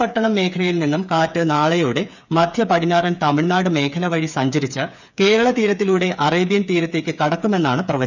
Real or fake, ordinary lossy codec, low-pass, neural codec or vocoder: fake; none; 7.2 kHz; codec, 16 kHz, 8 kbps, FreqCodec, smaller model